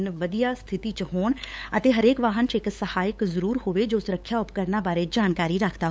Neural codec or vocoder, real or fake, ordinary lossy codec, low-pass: codec, 16 kHz, 8 kbps, FunCodec, trained on LibriTTS, 25 frames a second; fake; none; none